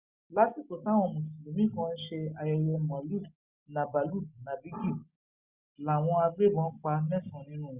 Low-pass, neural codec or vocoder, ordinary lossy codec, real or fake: 3.6 kHz; none; Opus, 64 kbps; real